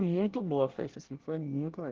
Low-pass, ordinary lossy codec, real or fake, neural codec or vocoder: 7.2 kHz; Opus, 16 kbps; fake; codec, 24 kHz, 1 kbps, SNAC